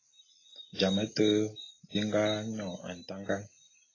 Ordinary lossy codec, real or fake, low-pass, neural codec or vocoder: AAC, 32 kbps; real; 7.2 kHz; none